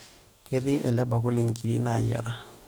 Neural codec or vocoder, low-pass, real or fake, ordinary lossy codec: codec, 44.1 kHz, 2.6 kbps, DAC; none; fake; none